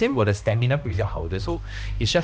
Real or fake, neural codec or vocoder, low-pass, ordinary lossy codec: fake; codec, 16 kHz, 1 kbps, X-Codec, HuBERT features, trained on balanced general audio; none; none